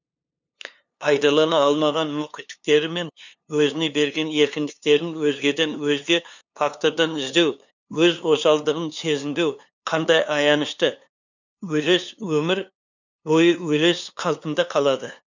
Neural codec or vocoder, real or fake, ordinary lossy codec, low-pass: codec, 16 kHz, 2 kbps, FunCodec, trained on LibriTTS, 25 frames a second; fake; none; 7.2 kHz